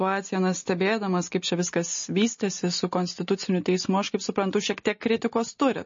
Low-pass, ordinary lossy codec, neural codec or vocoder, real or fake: 7.2 kHz; MP3, 32 kbps; none; real